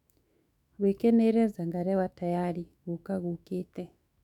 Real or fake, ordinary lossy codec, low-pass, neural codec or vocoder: fake; none; 19.8 kHz; autoencoder, 48 kHz, 128 numbers a frame, DAC-VAE, trained on Japanese speech